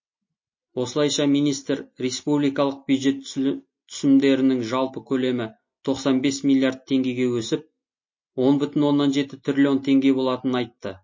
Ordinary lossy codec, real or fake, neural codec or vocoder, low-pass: MP3, 32 kbps; real; none; 7.2 kHz